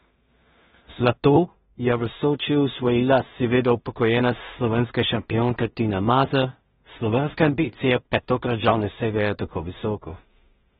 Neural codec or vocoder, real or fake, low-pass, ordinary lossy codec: codec, 16 kHz in and 24 kHz out, 0.4 kbps, LongCat-Audio-Codec, two codebook decoder; fake; 10.8 kHz; AAC, 16 kbps